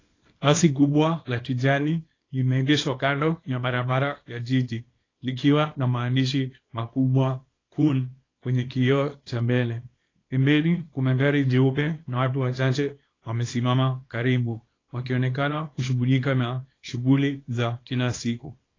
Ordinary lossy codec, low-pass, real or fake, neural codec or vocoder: AAC, 32 kbps; 7.2 kHz; fake; codec, 24 kHz, 0.9 kbps, WavTokenizer, small release